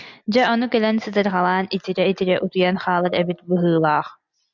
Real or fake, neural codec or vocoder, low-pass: real; none; 7.2 kHz